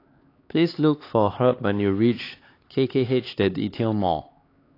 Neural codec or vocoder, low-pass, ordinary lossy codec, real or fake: codec, 16 kHz, 4 kbps, X-Codec, HuBERT features, trained on LibriSpeech; 5.4 kHz; AAC, 32 kbps; fake